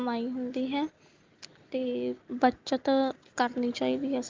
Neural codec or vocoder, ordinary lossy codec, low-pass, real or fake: none; Opus, 32 kbps; 7.2 kHz; real